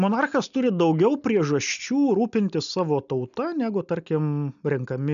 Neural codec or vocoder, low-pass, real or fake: none; 7.2 kHz; real